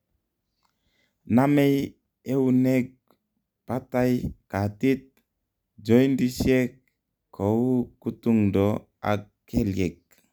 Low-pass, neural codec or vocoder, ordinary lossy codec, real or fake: none; none; none; real